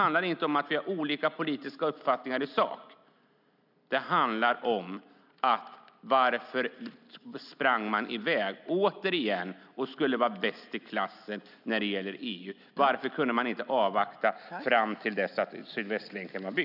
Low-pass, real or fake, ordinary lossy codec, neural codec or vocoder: 5.4 kHz; real; none; none